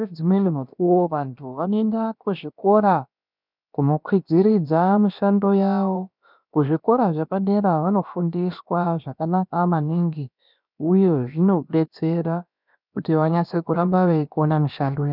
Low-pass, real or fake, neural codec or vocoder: 5.4 kHz; fake; codec, 16 kHz, about 1 kbps, DyCAST, with the encoder's durations